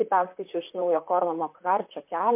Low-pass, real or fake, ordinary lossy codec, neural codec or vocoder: 3.6 kHz; fake; MP3, 32 kbps; vocoder, 44.1 kHz, 128 mel bands, Pupu-Vocoder